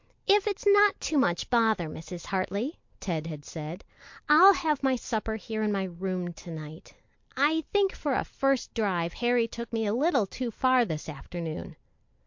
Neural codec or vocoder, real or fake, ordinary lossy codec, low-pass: none; real; MP3, 48 kbps; 7.2 kHz